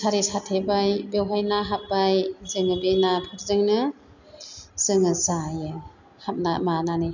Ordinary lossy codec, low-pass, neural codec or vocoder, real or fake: none; 7.2 kHz; none; real